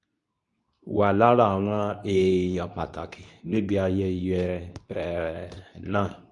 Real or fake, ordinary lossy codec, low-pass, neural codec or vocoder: fake; none; 10.8 kHz; codec, 24 kHz, 0.9 kbps, WavTokenizer, medium speech release version 2